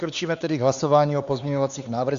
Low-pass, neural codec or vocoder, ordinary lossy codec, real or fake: 7.2 kHz; codec, 16 kHz, 4 kbps, X-Codec, HuBERT features, trained on LibriSpeech; Opus, 64 kbps; fake